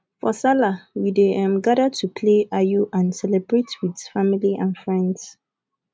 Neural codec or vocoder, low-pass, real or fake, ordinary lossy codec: none; none; real; none